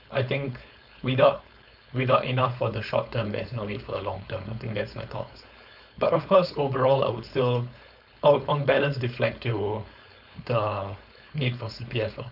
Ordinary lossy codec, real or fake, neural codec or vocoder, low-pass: none; fake; codec, 16 kHz, 4.8 kbps, FACodec; 5.4 kHz